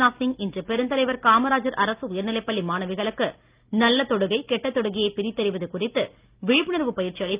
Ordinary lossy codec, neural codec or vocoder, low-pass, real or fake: Opus, 24 kbps; none; 3.6 kHz; real